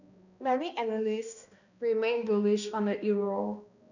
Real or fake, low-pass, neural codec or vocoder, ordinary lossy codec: fake; 7.2 kHz; codec, 16 kHz, 1 kbps, X-Codec, HuBERT features, trained on balanced general audio; none